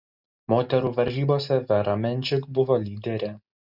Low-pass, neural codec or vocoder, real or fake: 5.4 kHz; none; real